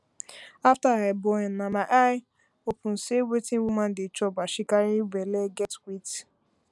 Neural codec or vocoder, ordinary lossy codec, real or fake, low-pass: none; none; real; none